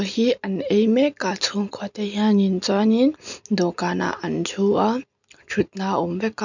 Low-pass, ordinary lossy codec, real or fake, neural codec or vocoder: 7.2 kHz; none; real; none